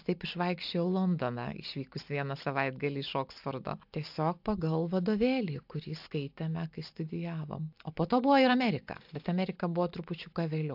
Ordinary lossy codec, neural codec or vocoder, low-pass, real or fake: AAC, 48 kbps; none; 5.4 kHz; real